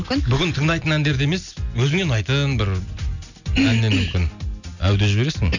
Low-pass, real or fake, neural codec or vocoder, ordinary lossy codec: 7.2 kHz; real; none; none